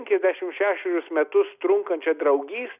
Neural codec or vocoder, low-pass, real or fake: none; 3.6 kHz; real